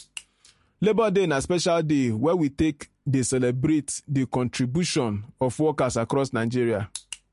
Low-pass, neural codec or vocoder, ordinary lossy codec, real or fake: 14.4 kHz; none; MP3, 48 kbps; real